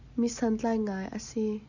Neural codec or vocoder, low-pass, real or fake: none; 7.2 kHz; real